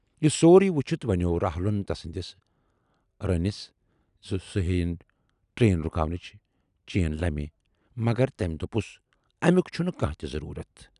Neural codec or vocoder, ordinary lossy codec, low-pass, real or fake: none; none; 10.8 kHz; real